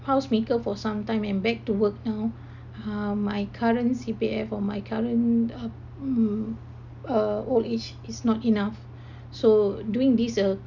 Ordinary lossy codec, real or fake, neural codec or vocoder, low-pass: none; real; none; 7.2 kHz